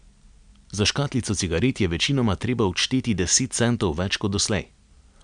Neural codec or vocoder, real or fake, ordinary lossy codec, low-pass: none; real; none; 9.9 kHz